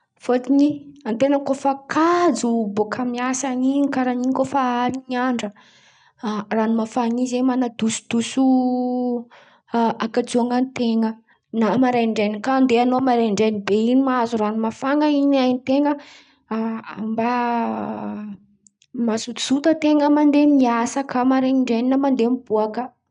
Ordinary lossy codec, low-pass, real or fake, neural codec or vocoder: none; 9.9 kHz; real; none